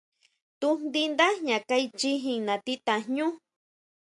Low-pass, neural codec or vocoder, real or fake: 10.8 kHz; none; real